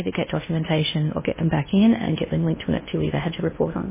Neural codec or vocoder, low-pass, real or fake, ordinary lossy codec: codec, 16 kHz in and 24 kHz out, 0.8 kbps, FocalCodec, streaming, 65536 codes; 3.6 kHz; fake; MP3, 16 kbps